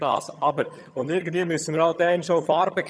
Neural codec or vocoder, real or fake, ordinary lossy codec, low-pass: vocoder, 22.05 kHz, 80 mel bands, HiFi-GAN; fake; none; none